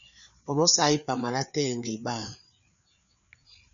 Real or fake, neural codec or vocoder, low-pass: fake; codec, 16 kHz, 4 kbps, FreqCodec, larger model; 7.2 kHz